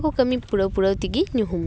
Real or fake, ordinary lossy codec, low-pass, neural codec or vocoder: real; none; none; none